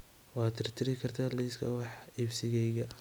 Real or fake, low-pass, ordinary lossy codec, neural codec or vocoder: real; none; none; none